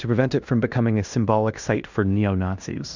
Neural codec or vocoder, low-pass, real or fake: codec, 16 kHz in and 24 kHz out, 0.9 kbps, LongCat-Audio-Codec, fine tuned four codebook decoder; 7.2 kHz; fake